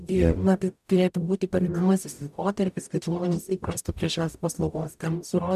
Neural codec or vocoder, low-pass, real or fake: codec, 44.1 kHz, 0.9 kbps, DAC; 14.4 kHz; fake